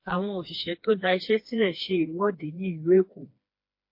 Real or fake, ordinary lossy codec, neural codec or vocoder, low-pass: fake; MP3, 32 kbps; codec, 16 kHz, 2 kbps, FreqCodec, smaller model; 5.4 kHz